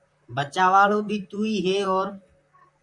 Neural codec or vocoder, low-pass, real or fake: vocoder, 44.1 kHz, 128 mel bands, Pupu-Vocoder; 10.8 kHz; fake